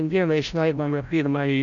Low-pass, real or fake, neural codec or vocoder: 7.2 kHz; fake; codec, 16 kHz, 0.5 kbps, FreqCodec, larger model